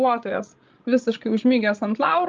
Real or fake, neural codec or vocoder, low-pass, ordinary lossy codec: fake; codec, 16 kHz, 16 kbps, FreqCodec, larger model; 7.2 kHz; Opus, 32 kbps